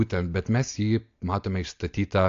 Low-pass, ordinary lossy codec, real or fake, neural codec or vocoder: 7.2 kHz; AAC, 48 kbps; real; none